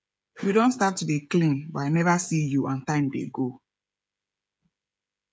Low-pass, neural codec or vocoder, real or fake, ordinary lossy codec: none; codec, 16 kHz, 16 kbps, FreqCodec, smaller model; fake; none